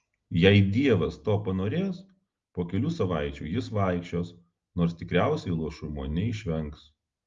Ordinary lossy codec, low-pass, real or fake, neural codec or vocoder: Opus, 32 kbps; 7.2 kHz; real; none